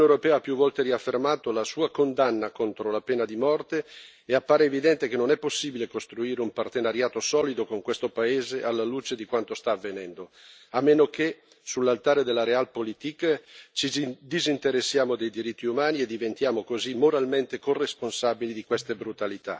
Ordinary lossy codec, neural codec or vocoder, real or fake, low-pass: none; none; real; none